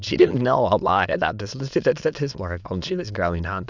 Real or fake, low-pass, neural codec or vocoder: fake; 7.2 kHz; autoencoder, 22.05 kHz, a latent of 192 numbers a frame, VITS, trained on many speakers